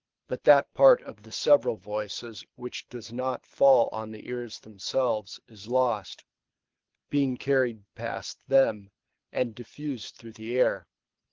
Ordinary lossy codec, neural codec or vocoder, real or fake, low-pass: Opus, 16 kbps; codec, 24 kHz, 6 kbps, HILCodec; fake; 7.2 kHz